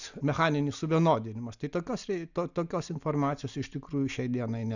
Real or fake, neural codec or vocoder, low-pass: real; none; 7.2 kHz